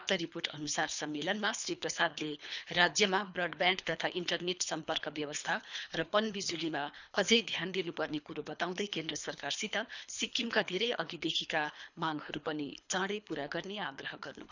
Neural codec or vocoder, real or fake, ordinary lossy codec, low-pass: codec, 24 kHz, 3 kbps, HILCodec; fake; none; 7.2 kHz